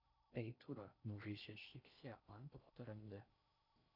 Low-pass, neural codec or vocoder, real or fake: 5.4 kHz; codec, 16 kHz in and 24 kHz out, 0.6 kbps, FocalCodec, streaming, 4096 codes; fake